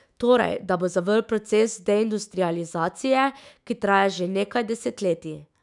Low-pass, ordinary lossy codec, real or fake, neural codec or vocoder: 10.8 kHz; none; fake; autoencoder, 48 kHz, 128 numbers a frame, DAC-VAE, trained on Japanese speech